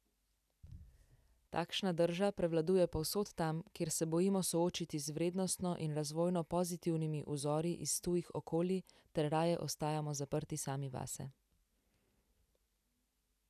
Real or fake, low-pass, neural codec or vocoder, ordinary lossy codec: real; 14.4 kHz; none; none